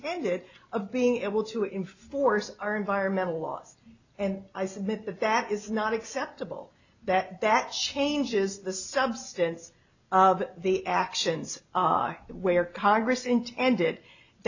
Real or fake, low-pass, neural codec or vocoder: real; 7.2 kHz; none